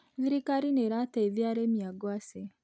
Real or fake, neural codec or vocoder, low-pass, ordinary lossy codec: real; none; none; none